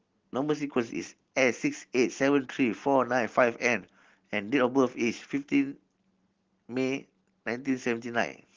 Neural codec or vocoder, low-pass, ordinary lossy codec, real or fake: none; 7.2 kHz; Opus, 16 kbps; real